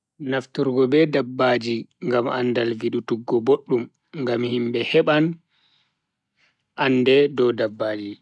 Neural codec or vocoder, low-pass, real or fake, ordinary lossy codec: none; 10.8 kHz; real; MP3, 96 kbps